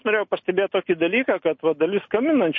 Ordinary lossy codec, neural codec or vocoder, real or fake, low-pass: MP3, 32 kbps; none; real; 7.2 kHz